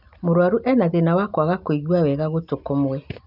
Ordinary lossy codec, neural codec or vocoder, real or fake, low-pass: none; none; real; 5.4 kHz